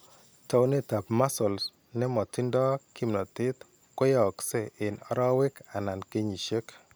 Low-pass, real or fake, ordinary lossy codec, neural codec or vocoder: none; real; none; none